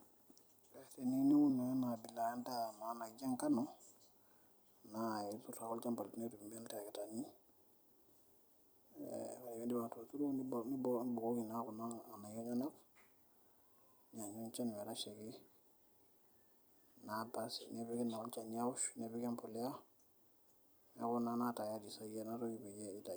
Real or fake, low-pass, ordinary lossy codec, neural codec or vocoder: fake; none; none; vocoder, 44.1 kHz, 128 mel bands every 256 samples, BigVGAN v2